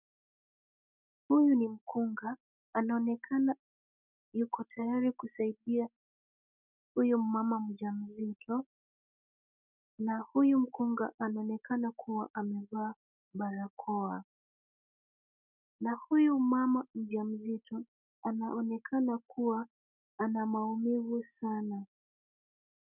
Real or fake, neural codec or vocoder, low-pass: real; none; 3.6 kHz